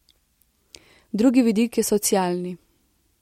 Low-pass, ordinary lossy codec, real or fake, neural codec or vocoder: 19.8 kHz; MP3, 64 kbps; real; none